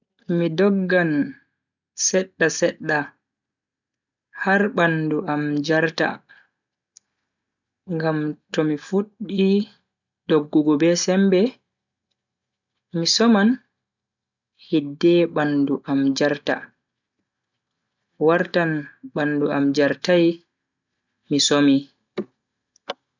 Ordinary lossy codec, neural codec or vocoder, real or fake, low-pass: none; none; real; 7.2 kHz